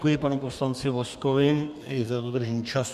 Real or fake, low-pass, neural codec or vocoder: fake; 14.4 kHz; codec, 32 kHz, 1.9 kbps, SNAC